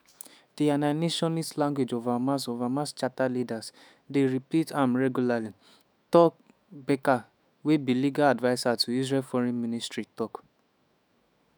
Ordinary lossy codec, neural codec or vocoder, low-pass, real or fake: none; autoencoder, 48 kHz, 128 numbers a frame, DAC-VAE, trained on Japanese speech; none; fake